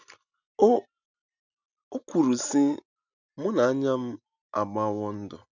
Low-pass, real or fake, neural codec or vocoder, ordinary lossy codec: 7.2 kHz; real; none; none